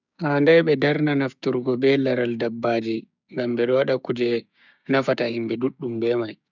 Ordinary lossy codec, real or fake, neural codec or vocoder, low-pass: none; fake; codec, 16 kHz, 6 kbps, DAC; 7.2 kHz